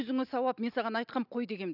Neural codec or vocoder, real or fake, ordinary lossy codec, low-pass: none; real; none; 5.4 kHz